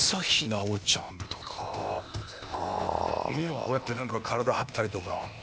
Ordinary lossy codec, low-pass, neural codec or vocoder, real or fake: none; none; codec, 16 kHz, 0.8 kbps, ZipCodec; fake